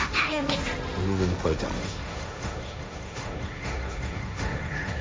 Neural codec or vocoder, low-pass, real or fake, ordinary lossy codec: codec, 16 kHz, 1.1 kbps, Voila-Tokenizer; none; fake; none